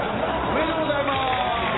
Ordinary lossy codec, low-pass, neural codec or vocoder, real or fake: AAC, 16 kbps; 7.2 kHz; none; real